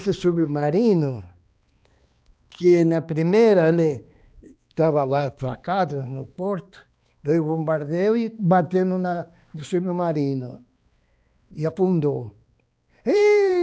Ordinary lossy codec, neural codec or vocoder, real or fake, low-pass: none; codec, 16 kHz, 2 kbps, X-Codec, HuBERT features, trained on balanced general audio; fake; none